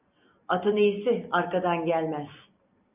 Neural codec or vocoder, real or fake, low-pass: none; real; 3.6 kHz